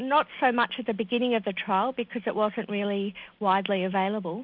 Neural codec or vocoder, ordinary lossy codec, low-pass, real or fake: none; MP3, 48 kbps; 5.4 kHz; real